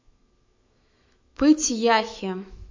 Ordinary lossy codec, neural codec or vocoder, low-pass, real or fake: MP3, 48 kbps; autoencoder, 48 kHz, 128 numbers a frame, DAC-VAE, trained on Japanese speech; 7.2 kHz; fake